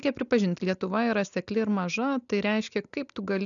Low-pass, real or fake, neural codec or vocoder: 7.2 kHz; real; none